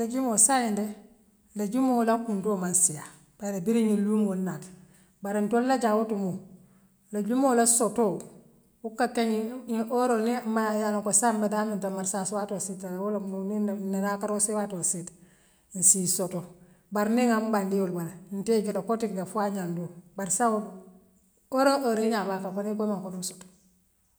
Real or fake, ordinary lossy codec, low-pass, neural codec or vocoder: real; none; none; none